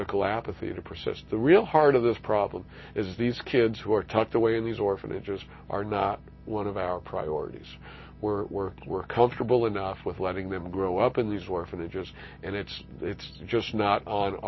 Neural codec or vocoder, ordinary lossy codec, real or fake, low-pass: none; MP3, 24 kbps; real; 7.2 kHz